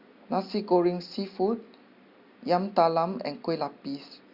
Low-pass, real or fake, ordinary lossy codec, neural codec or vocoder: 5.4 kHz; real; Opus, 64 kbps; none